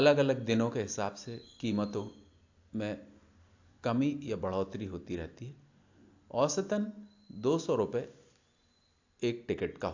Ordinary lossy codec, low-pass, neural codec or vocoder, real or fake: AAC, 48 kbps; 7.2 kHz; none; real